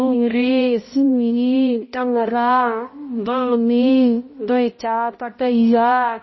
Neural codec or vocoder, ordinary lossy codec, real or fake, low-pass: codec, 16 kHz, 0.5 kbps, X-Codec, HuBERT features, trained on balanced general audio; MP3, 24 kbps; fake; 7.2 kHz